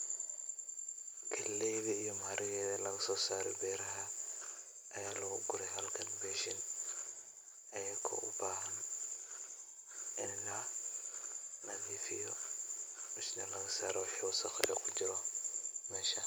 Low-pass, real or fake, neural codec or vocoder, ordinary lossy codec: none; real; none; none